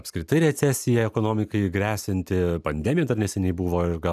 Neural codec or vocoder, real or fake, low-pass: none; real; 14.4 kHz